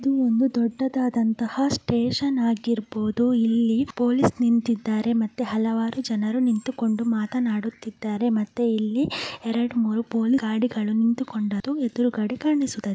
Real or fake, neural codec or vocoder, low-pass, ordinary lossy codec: real; none; none; none